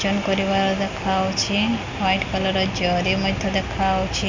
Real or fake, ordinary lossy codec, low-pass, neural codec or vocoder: real; MP3, 64 kbps; 7.2 kHz; none